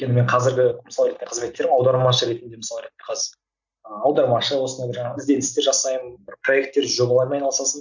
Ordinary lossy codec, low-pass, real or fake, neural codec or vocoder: none; 7.2 kHz; real; none